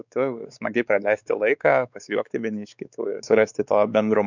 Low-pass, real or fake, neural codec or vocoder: 7.2 kHz; fake; codec, 16 kHz, 4 kbps, X-Codec, WavLM features, trained on Multilingual LibriSpeech